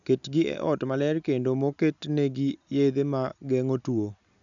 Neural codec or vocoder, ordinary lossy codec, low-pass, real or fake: none; none; 7.2 kHz; real